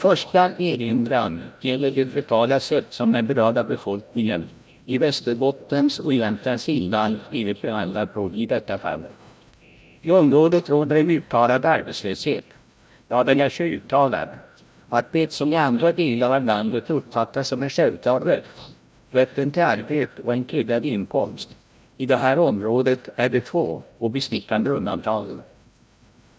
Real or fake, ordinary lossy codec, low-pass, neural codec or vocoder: fake; none; none; codec, 16 kHz, 0.5 kbps, FreqCodec, larger model